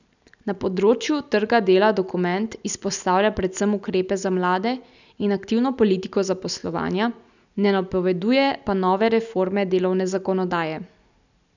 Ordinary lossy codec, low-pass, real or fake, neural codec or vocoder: none; 7.2 kHz; real; none